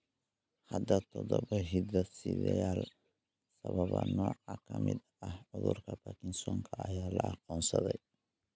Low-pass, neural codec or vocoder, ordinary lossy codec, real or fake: none; none; none; real